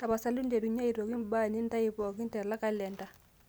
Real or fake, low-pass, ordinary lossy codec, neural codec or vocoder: real; none; none; none